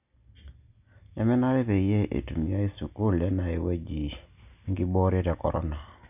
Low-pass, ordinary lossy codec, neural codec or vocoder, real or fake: 3.6 kHz; none; none; real